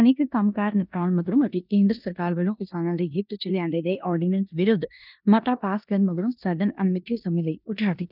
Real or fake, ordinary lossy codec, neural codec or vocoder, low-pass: fake; none; codec, 16 kHz in and 24 kHz out, 0.9 kbps, LongCat-Audio-Codec, four codebook decoder; 5.4 kHz